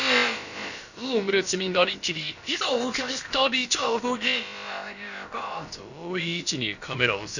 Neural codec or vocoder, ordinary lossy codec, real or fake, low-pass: codec, 16 kHz, about 1 kbps, DyCAST, with the encoder's durations; none; fake; 7.2 kHz